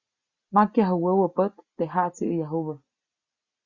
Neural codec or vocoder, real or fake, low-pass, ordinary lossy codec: none; real; 7.2 kHz; Opus, 64 kbps